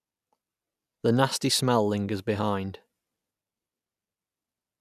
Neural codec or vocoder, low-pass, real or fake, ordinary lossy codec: none; 14.4 kHz; real; none